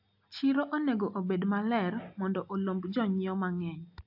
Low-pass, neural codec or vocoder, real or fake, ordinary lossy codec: 5.4 kHz; none; real; AAC, 48 kbps